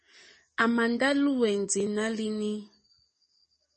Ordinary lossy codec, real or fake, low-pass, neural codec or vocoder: MP3, 32 kbps; fake; 10.8 kHz; codec, 44.1 kHz, 7.8 kbps, DAC